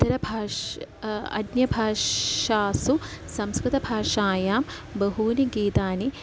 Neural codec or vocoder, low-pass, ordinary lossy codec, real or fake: none; none; none; real